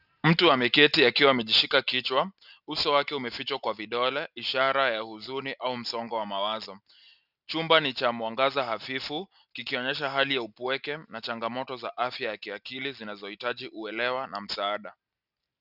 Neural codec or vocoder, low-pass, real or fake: none; 5.4 kHz; real